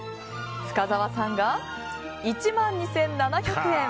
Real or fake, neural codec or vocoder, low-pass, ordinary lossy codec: real; none; none; none